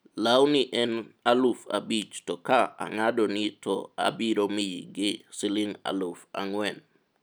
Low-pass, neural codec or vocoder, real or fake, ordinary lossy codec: none; none; real; none